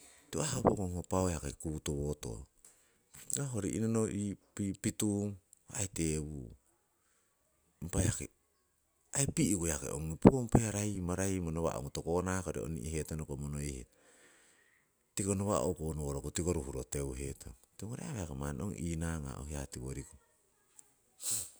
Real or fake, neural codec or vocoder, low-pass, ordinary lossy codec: real; none; none; none